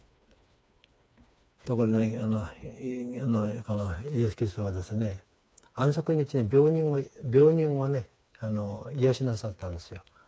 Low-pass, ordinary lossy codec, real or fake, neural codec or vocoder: none; none; fake; codec, 16 kHz, 4 kbps, FreqCodec, smaller model